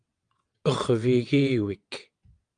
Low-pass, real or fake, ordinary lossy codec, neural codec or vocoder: 9.9 kHz; fake; Opus, 32 kbps; vocoder, 22.05 kHz, 80 mel bands, WaveNeXt